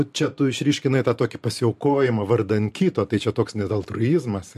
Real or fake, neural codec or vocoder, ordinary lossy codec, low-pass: fake; vocoder, 44.1 kHz, 128 mel bands every 512 samples, BigVGAN v2; MP3, 64 kbps; 14.4 kHz